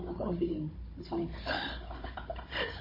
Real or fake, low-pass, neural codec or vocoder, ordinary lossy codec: fake; 5.4 kHz; codec, 16 kHz, 16 kbps, FunCodec, trained on Chinese and English, 50 frames a second; MP3, 24 kbps